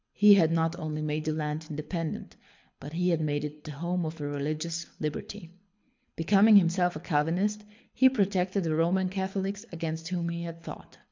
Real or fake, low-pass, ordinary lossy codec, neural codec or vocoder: fake; 7.2 kHz; MP3, 64 kbps; codec, 24 kHz, 6 kbps, HILCodec